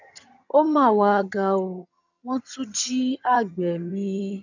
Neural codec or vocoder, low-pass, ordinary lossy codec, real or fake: vocoder, 22.05 kHz, 80 mel bands, HiFi-GAN; 7.2 kHz; none; fake